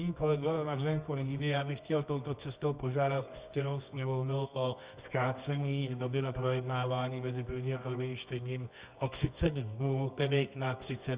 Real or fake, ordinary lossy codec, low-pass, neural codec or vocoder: fake; Opus, 64 kbps; 3.6 kHz; codec, 24 kHz, 0.9 kbps, WavTokenizer, medium music audio release